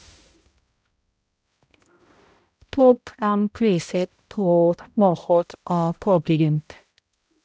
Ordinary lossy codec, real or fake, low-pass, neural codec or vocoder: none; fake; none; codec, 16 kHz, 0.5 kbps, X-Codec, HuBERT features, trained on balanced general audio